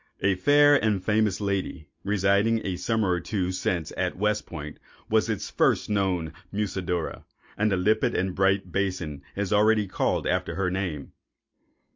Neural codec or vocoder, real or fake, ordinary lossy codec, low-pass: none; real; MP3, 48 kbps; 7.2 kHz